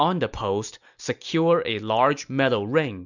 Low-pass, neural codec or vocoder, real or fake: 7.2 kHz; none; real